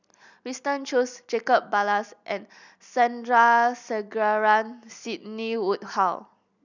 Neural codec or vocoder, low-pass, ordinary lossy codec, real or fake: none; 7.2 kHz; none; real